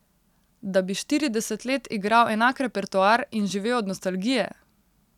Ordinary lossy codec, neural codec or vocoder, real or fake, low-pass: none; none; real; 19.8 kHz